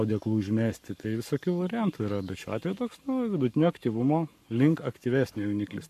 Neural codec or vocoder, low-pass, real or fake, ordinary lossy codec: codec, 44.1 kHz, 7.8 kbps, Pupu-Codec; 14.4 kHz; fake; MP3, 96 kbps